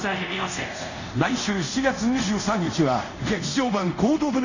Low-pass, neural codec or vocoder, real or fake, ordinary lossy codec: 7.2 kHz; codec, 24 kHz, 0.5 kbps, DualCodec; fake; none